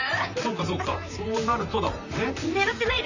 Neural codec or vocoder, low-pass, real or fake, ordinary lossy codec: vocoder, 44.1 kHz, 128 mel bands, Pupu-Vocoder; 7.2 kHz; fake; none